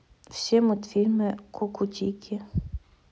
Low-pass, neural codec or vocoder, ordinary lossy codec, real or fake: none; none; none; real